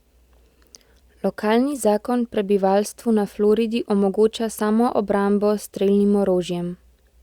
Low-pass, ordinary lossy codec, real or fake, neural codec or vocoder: 19.8 kHz; Opus, 64 kbps; real; none